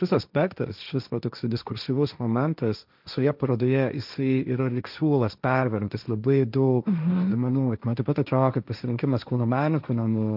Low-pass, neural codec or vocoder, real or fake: 5.4 kHz; codec, 16 kHz, 1.1 kbps, Voila-Tokenizer; fake